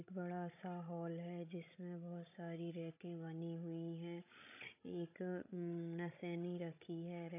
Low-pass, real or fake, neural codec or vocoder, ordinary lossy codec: 3.6 kHz; fake; codec, 16 kHz, 16 kbps, FreqCodec, larger model; AAC, 32 kbps